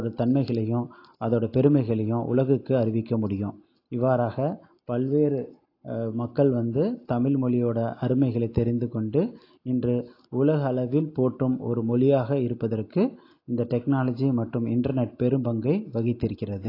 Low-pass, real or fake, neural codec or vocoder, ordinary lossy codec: 5.4 kHz; real; none; AAC, 32 kbps